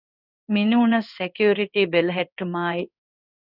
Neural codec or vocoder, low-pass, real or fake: codec, 16 kHz in and 24 kHz out, 1 kbps, XY-Tokenizer; 5.4 kHz; fake